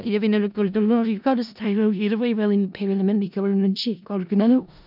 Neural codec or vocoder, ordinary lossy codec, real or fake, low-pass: codec, 16 kHz in and 24 kHz out, 0.4 kbps, LongCat-Audio-Codec, four codebook decoder; none; fake; 5.4 kHz